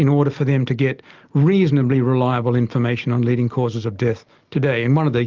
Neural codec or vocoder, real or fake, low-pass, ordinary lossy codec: none; real; 7.2 kHz; Opus, 32 kbps